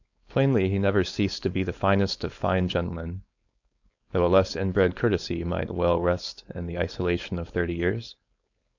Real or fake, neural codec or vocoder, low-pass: fake; codec, 16 kHz, 4.8 kbps, FACodec; 7.2 kHz